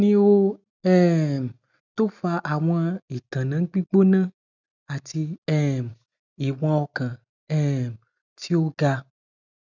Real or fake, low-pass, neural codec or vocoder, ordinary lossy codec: real; 7.2 kHz; none; none